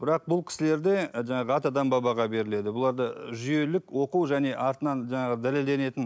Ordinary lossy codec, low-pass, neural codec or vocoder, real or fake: none; none; none; real